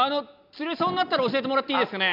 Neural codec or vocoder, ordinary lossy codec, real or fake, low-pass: none; none; real; 5.4 kHz